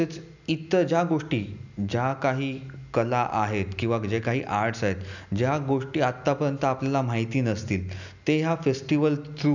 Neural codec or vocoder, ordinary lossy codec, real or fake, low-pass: none; none; real; 7.2 kHz